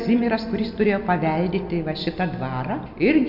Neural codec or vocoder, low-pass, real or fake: none; 5.4 kHz; real